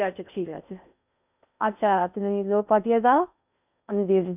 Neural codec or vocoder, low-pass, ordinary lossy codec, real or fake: codec, 16 kHz in and 24 kHz out, 0.6 kbps, FocalCodec, streaming, 4096 codes; 3.6 kHz; none; fake